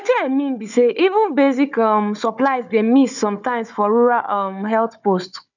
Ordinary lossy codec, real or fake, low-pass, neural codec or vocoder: none; fake; 7.2 kHz; codec, 16 kHz, 4 kbps, FunCodec, trained on Chinese and English, 50 frames a second